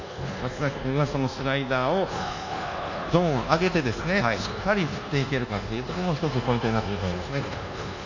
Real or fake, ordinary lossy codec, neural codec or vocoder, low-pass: fake; none; codec, 24 kHz, 1.2 kbps, DualCodec; 7.2 kHz